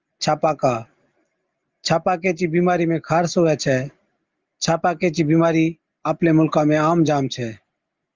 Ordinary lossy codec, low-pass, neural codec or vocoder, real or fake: Opus, 32 kbps; 7.2 kHz; none; real